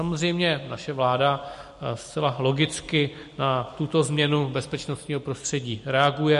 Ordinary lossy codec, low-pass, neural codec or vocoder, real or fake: MP3, 48 kbps; 14.4 kHz; none; real